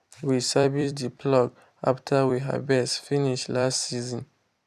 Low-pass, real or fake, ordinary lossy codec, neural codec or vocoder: 14.4 kHz; fake; none; vocoder, 44.1 kHz, 128 mel bands every 256 samples, BigVGAN v2